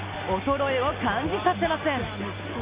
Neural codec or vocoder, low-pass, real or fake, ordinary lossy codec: none; 3.6 kHz; real; Opus, 64 kbps